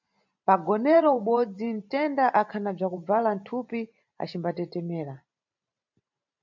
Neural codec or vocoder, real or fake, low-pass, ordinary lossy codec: vocoder, 44.1 kHz, 128 mel bands every 512 samples, BigVGAN v2; fake; 7.2 kHz; MP3, 64 kbps